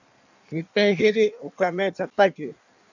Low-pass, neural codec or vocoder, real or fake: 7.2 kHz; codec, 16 kHz in and 24 kHz out, 1.1 kbps, FireRedTTS-2 codec; fake